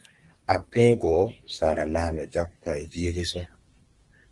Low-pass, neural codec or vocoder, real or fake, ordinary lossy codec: 10.8 kHz; codec, 24 kHz, 1 kbps, SNAC; fake; Opus, 24 kbps